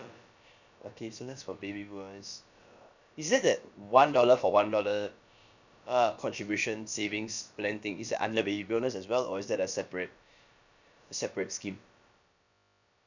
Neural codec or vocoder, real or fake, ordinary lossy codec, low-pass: codec, 16 kHz, about 1 kbps, DyCAST, with the encoder's durations; fake; none; 7.2 kHz